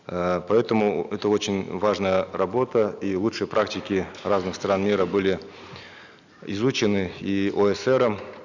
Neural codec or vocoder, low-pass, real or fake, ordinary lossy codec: none; 7.2 kHz; real; none